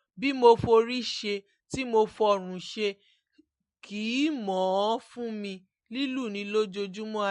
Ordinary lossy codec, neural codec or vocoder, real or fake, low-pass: MP3, 48 kbps; none; real; 10.8 kHz